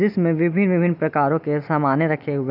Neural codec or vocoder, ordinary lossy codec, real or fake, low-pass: none; none; real; 5.4 kHz